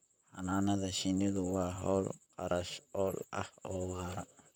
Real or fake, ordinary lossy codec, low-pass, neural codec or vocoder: fake; none; none; vocoder, 44.1 kHz, 128 mel bands, Pupu-Vocoder